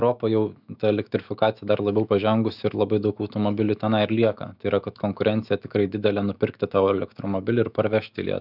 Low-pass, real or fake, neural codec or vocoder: 5.4 kHz; fake; autoencoder, 48 kHz, 128 numbers a frame, DAC-VAE, trained on Japanese speech